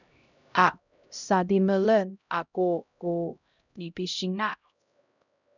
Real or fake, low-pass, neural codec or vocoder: fake; 7.2 kHz; codec, 16 kHz, 0.5 kbps, X-Codec, HuBERT features, trained on LibriSpeech